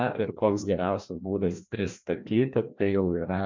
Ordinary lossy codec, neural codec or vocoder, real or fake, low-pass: MP3, 64 kbps; codec, 16 kHz, 1 kbps, FreqCodec, larger model; fake; 7.2 kHz